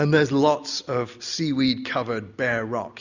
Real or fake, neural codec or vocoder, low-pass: real; none; 7.2 kHz